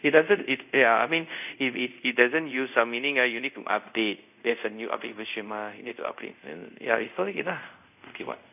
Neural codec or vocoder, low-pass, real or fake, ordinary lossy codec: codec, 24 kHz, 0.5 kbps, DualCodec; 3.6 kHz; fake; none